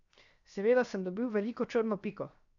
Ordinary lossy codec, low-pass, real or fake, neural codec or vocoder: none; 7.2 kHz; fake; codec, 16 kHz, 0.7 kbps, FocalCodec